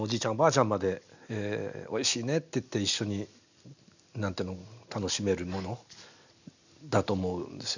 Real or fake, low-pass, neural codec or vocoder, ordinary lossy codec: real; 7.2 kHz; none; none